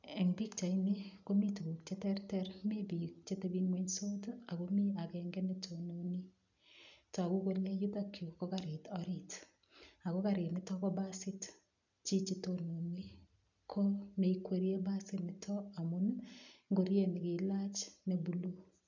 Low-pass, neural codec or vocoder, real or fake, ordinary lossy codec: 7.2 kHz; none; real; none